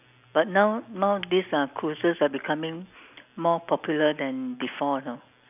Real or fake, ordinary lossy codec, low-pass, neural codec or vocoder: real; none; 3.6 kHz; none